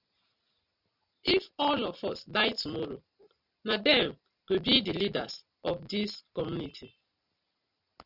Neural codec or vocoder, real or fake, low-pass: none; real; 5.4 kHz